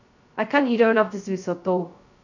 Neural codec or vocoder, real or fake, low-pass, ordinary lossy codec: codec, 16 kHz, 0.2 kbps, FocalCodec; fake; 7.2 kHz; none